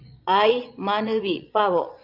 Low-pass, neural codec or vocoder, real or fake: 5.4 kHz; vocoder, 22.05 kHz, 80 mel bands, Vocos; fake